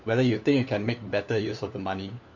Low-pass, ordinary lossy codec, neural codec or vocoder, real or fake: 7.2 kHz; none; codec, 16 kHz, 4 kbps, FunCodec, trained on LibriTTS, 50 frames a second; fake